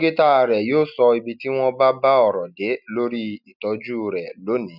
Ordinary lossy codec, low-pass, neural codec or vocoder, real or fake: none; 5.4 kHz; none; real